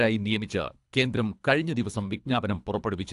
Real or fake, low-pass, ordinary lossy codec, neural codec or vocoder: fake; 10.8 kHz; AAC, 64 kbps; codec, 24 kHz, 3 kbps, HILCodec